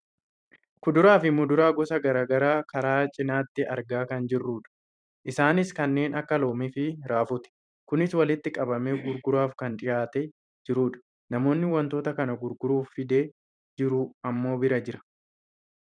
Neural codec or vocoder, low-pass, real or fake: vocoder, 44.1 kHz, 128 mel bands every 512 samples, BigVGAN v2; 9.9 kHz; fake